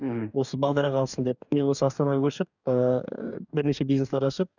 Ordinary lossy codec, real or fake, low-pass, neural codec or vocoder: none; fake; 7.2 kHz; codec, 44.1 kHz, 2.6 kbps, DAC